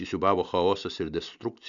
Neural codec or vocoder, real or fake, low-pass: none; real; 7.2 kHz